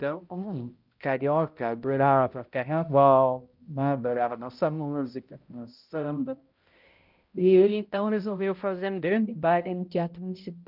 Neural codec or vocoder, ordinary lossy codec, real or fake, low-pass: codec, 16 kHz, 0.5 kbps, X-Codec, HuBERT features, trained on balanced general audio; Opus, 24 kbps; fake; 5.4 kHz